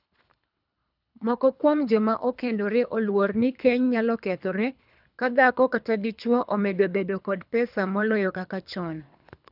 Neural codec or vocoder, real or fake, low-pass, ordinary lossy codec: codec, 24 kHz, 3 kbps, HILCodec; fake; 5.4 kHz; none